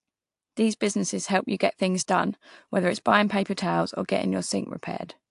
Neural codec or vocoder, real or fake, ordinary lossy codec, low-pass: none; real; AAC, 64 kbps; 10.8 kHz